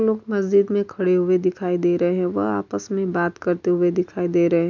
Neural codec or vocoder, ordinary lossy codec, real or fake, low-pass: none; MP3, 64 kbps; real; 7.2 kHz